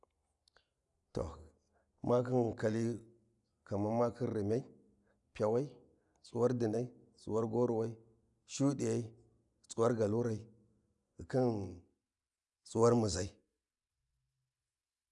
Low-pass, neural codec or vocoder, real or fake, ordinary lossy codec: 10.8 kHz; none; real; none